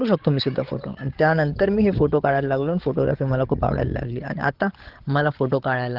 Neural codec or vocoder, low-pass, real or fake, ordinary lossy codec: codec, 16 kHz, 8 kbps, FreqCodec, larger model; 5.4 kHz; fake; Opus, 16 kbps